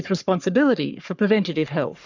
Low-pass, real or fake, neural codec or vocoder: 7.2 kHz; fake; codec, 44.1 kHz, 3.4 kbps, Pupu-Codec